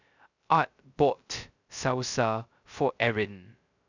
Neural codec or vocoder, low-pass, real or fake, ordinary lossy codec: codec, 16 kHz, 0.2 kbps, FocalCodec; 7.2 kHz; fake; none